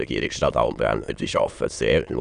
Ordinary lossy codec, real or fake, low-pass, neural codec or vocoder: none; fake; 9.9 kHz; autoencoder, 22.05 kHz, a latent of 192 numbers a frame, VITS, trained on many speakers